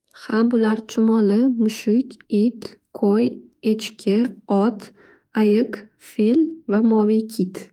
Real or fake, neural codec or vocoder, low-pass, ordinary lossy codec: fake; autoencoder, 48 kHz, 32 numbers a frame, DAC-VAE, trained on Japanese speech; 19.8 kHz; Opus, 32 kbps